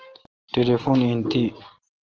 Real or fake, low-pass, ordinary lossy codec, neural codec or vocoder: real; 7.2 kHz; Opus, 16 kbps; none